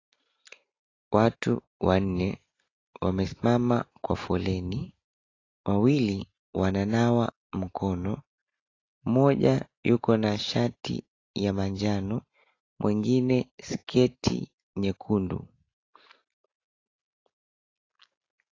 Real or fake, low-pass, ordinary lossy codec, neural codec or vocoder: real; 7.2 kHz; AAC, 32 kbps; none